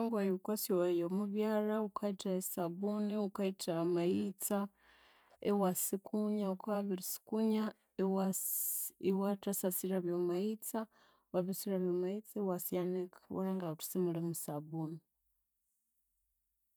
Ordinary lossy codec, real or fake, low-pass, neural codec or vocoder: none; fake; none; vocoder, 44.1 kHz, 128 mel bands every 512 samples, BigVGAN v2